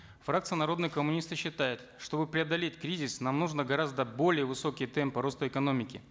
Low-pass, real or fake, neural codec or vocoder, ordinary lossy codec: none; real; none; none